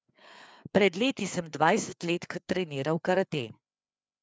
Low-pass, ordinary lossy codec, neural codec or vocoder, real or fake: none; none; codec, 16 kHz, 4 kbps, FreqCodec, larger model; fake